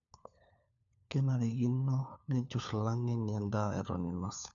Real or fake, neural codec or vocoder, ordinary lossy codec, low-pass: fake; codec, 16 kHz, 4 kbps, FunCodec, trained on LibriTTS, 50 frames a second; none; 7.2 kHz